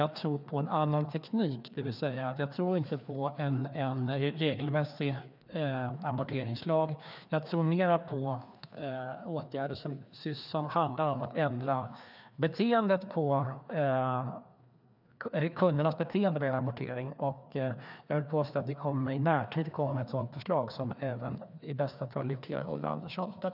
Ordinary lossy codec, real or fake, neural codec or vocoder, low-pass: none; fake; codec, 16 kHz, 2 kbps, FreqCodec, larger model; 5.4 kHz